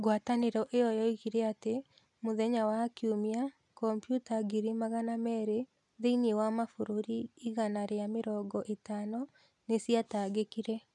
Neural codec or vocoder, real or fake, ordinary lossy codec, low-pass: none; real; none; 10.8 kHz